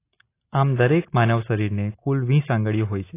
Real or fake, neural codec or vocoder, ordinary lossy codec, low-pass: real; none; AAC, 24 kbps; 3.6 kHz